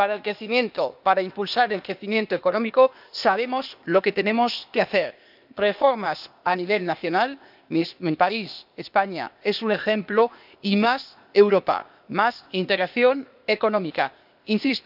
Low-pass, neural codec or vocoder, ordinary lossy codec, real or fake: 5.4 kHz; codec, 16 kHz, 0.8 kbps, ZipCodec; none; fake